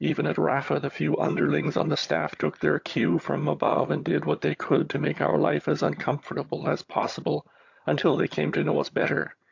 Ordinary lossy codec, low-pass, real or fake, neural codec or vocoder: AAC, 48 kbps; 7.2 kHz; fake; vocoder, 22.05 kHz, 80 mel bands, HiFi-GAN